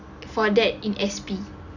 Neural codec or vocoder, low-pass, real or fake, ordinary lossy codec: none; 7.2 kHz; real; none